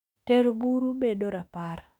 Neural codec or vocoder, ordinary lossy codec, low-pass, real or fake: autoencoder, 48 kHz, 32 numbers a frame, DAC-VAE, trained on Japanese speech; none; 19.8 kHz; fake